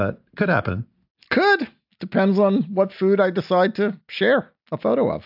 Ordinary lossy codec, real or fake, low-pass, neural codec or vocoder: AAC, 48 kbps; real; 5.4 kHz; none